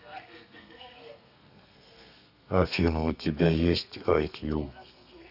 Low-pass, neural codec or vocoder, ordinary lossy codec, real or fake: 5.4 kHz; codec, 32 kHz, 1.9 kbps, SNAC; none; fake